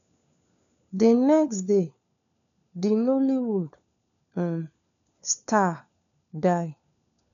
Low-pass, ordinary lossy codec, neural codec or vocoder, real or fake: 7.2 kHz; none; codec, 16 kHz, 4 kbps, FunCodec, trained on LibriTTS, 50 frames a second; fake